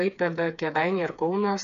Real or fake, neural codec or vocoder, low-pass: fake; codec, 16 kHz, 4 kbps, FreqCodec, smaller model; 7.2 kHz